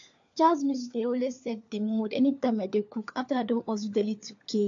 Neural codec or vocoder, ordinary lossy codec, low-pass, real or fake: codec, 16 kHz, 4 kbps, FunCodec, trained on LibriTTS, 50 frames a second; AAC, 48 kbps; 7.2 kHz; fake